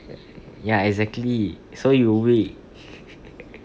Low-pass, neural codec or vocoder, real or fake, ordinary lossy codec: none; none; real; none